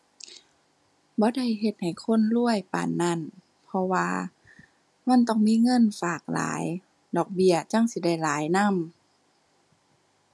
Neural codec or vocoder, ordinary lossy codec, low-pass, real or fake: none; none; none; real